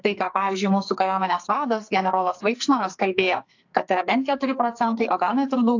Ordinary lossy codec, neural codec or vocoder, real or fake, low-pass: AAC, 48 kbps; codec, 44.1 kHz, 2.6 kbps, SNAC; fake; 7.2 kHz